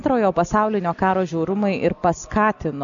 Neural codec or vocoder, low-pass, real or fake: none; 7.2 kHz; real